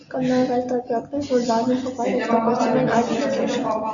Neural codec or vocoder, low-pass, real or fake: none; 7.2 kHz; real